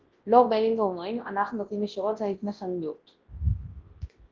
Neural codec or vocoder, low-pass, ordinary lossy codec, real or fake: codec, 24 kHz, 0.9 kbps, WavTokenizer, large speech release; 7.2 kHz; Opus, 16 kbps; fake